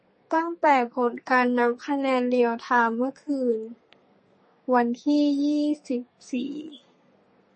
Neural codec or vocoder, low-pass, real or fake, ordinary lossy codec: codec, 32 kHz, 1.9 kbps, SNAC; 10.8 kHz; fake; MP3, 32 kbps